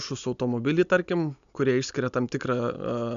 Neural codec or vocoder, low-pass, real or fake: none; 7.2 kHz; real